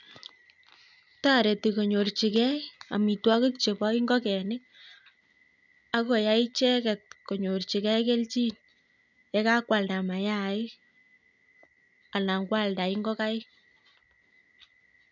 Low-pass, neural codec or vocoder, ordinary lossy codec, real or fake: 7.2 kHz; none; none; real